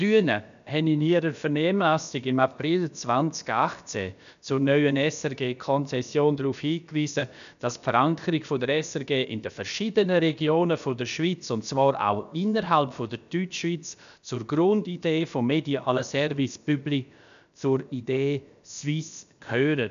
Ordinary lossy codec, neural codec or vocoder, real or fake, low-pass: none; codec, 16 kHz, about 1 kbps, DyCAST, with the encoder's durations; fake; 7.2 kHz